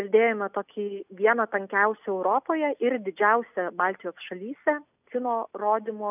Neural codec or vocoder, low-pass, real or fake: none; 3.6 kHz; real